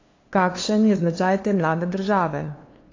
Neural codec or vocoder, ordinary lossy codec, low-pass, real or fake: codec, 16 kHz, 2 kbps, FunCodec, trained on LibriTTS, 25 frames a second; AAC, 32 kbps; 7.2 kHz; fake